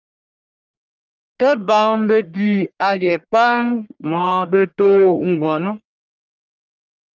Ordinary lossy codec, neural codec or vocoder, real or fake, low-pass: Opus, 24 kbps; codec, 44.1 kHz, 1.7 kbps, Pupu-Codec; fake; 7.2 kHz